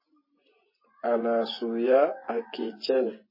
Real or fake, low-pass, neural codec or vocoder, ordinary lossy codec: fake; 5.4 kHz; vocoder, 24 kHz, 100 mel bands, Vocos; MP3, 24 kbps